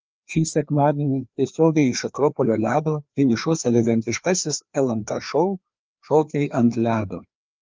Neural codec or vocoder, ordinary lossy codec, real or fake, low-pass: codec, 16 kHz, 2 kbps, FreqCodec, larger model; Opus, 24 kbps; fake; 7.2 kHz